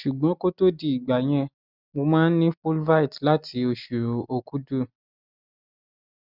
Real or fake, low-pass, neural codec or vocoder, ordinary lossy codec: real; 5.4 kHz; none; none